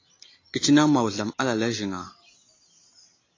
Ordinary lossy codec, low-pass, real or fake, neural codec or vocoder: AAC, 32 kbps; 7.2 kHz; real; none